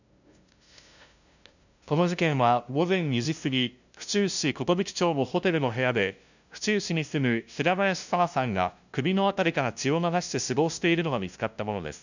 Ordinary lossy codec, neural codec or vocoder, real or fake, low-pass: none; codec, 16 kHz, 0.5 kbps, FunCodec, trained on LibriTTS, 25 frames a second; fake; 7.2 kHz